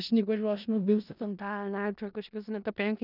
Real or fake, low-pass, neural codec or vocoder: fake; 5.4 kHz; codec, 16 kHz in and 24 kHz out, 0.4 kbps, LongCat-Audio-Codec, four codebook decoder